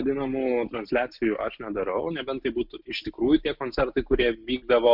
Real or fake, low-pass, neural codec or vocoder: real; 5.4 kHz; none